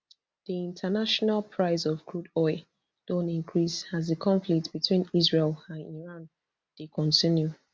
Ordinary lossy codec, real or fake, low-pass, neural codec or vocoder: none; real; none; none